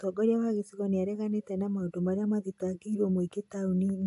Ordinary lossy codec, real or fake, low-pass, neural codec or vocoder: AAC, 64 kbps; real; 10.8 kHz; none